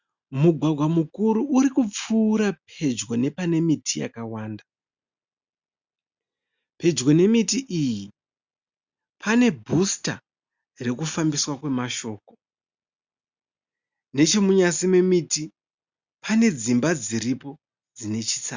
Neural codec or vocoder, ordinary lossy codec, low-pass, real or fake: none; Opus, 64 kbps; 7.2 kHz; real